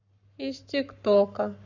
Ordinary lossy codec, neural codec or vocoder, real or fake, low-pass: none; codec, 44.1 kHz, 7.8 kbps, Pupu-Codec; fake; 7.2 kHz